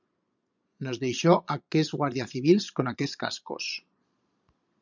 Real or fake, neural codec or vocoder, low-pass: fake; vocoder, 22.05 kHz, 80 mel bands, Vocos; 7.2 kHz